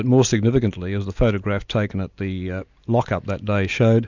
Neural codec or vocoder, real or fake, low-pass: none; real; 7.2 kHz